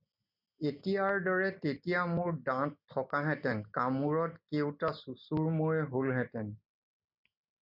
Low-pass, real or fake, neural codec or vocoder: 5.4 kHz; real; none